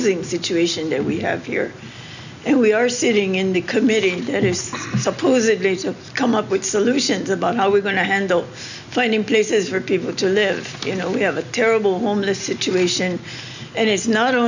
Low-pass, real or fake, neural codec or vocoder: 7.2 kHz; real; none